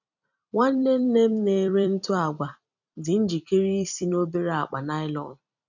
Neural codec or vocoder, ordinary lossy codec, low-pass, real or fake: vocoder, 44.1 kHz, 128 mel bands every 256 samples, BigVGAN v2; none; 7.2 kHz; fake